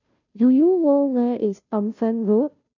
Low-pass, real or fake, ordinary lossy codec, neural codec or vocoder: 7.2 kHz; fake; none; codec, 16 kHz, 0.5 kbps, FunCodec, trained on Chinese and English, 25 frames a second